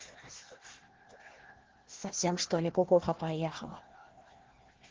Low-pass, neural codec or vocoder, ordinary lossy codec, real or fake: 7.2 kHz; codec, 16 kHz, 1 kbps, FunCodec, trained on Chinese and English, 50 frames a second; Opus, 16 kbps; fake